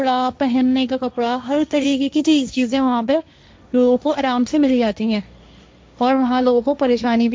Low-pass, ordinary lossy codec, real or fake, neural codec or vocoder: none; none; fake; codec, 16 kHz, 1.1 kbps, Voila-Tokenizer